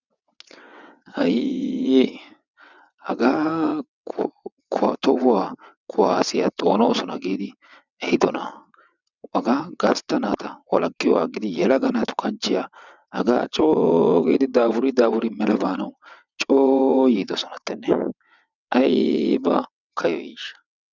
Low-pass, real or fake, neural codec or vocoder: 7.2 kHz; fake; vocoder, 22.05 kHz, 80 mel bands, WaveNeXt